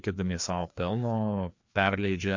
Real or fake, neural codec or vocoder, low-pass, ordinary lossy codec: fake; codec, 16 kHz, 2 kbps, FreqCodec, larger model; 7.2 kHz; MP3, 48 kbps